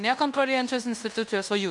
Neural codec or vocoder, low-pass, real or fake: codec, 16 kHz in and 24 kHz out, 0.9 kbps, LongCat-Audio-Codec, fine tuned four codebook decoder; 10.8 kHz; fake